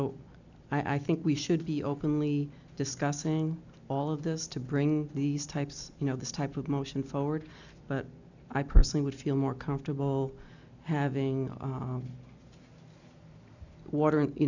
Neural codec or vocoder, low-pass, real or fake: none; 7.2 kHz; real